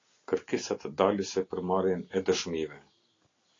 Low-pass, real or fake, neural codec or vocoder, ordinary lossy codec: 7.2 kHz; real; none; AAC, 32 kbps